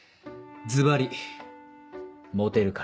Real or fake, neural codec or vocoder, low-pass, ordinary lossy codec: real; none; none; none